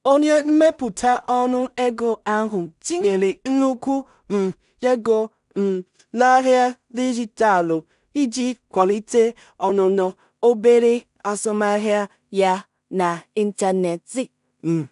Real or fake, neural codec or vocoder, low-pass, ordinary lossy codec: fake; codec, 16 kHz in and 24 kHz out, 0.4 kbps, LongCat-Audio-Codec, two codebook decoder; 10.8 kHz; none